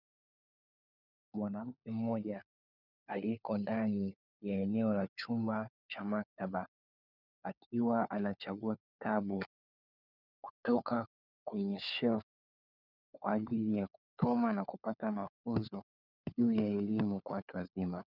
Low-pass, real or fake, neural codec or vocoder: 5.4 kHz; fake; codec, 16 kHz, 4 kbps, FunCodec, trained on LibriTTS, 50 frames a second